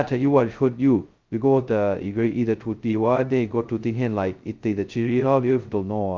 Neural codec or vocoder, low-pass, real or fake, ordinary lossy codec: codec, 16 kHz, 0.2 kbps, FocalCodec; 7.2 kHz; fake; Opus, 24 kbps